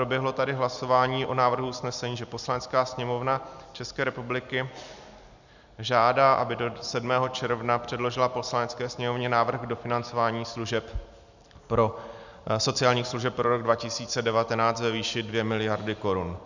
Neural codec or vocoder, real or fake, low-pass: none; real; 7.2 kHz